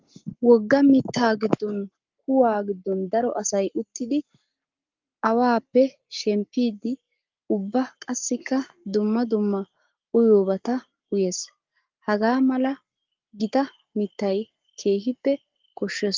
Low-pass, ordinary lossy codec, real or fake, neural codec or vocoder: 7.2 kHz; Opus, 24 kbps; fake; codec, 44.1 kHz, 7.8 kbps, Pupu-Codec